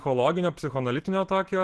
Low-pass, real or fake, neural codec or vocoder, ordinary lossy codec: 10.8 kHz; real; none; Opus, 16 kbps